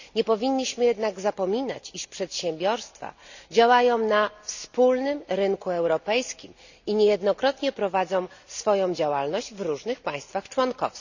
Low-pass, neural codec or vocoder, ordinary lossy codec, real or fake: 7.2 kHz; none; none; real